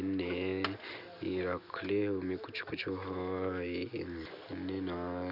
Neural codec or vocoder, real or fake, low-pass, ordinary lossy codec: none; real; 5.4 kHz; none